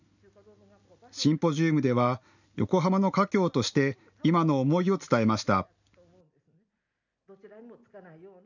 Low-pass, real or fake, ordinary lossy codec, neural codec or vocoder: 7.2 kHz; real; none; none